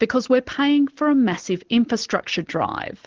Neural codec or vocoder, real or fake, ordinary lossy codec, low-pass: none; real; Opus, 16 kbps; 7.2 kHz